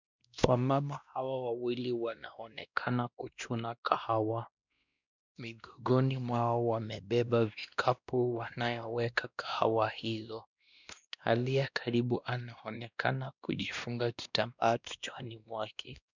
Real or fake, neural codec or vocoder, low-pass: fake; codec, 16 kHz, 1 kbps, X-Codec, WavLM features, trained on Multilingual LibriSpeech; 7.2 kHz